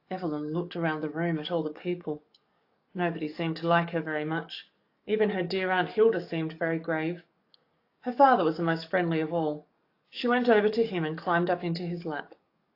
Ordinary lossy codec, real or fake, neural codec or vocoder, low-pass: AAC, 32 kbps; fake; codec, 44.1 kHz, 7.8 kbps, DAC; 5.4 kHz